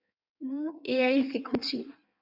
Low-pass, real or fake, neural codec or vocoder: 5.4 kHz; fake; codec, 16 kHz in and 24 kHz out, 1.1 kbps, FireRedTTS-2 codec